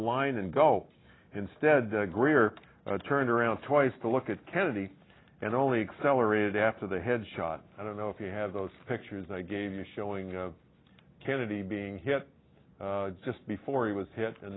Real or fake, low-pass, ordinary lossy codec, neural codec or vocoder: real; 7.2 kHz; AAC, 16 kbps; none